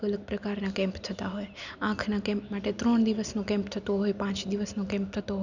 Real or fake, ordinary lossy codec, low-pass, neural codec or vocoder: real; none; 7.2 kHz; none